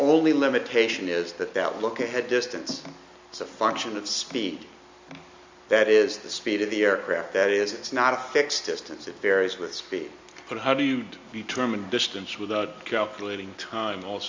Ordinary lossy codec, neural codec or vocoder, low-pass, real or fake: MP3, 64 kbps; none; 7.2 kHz; real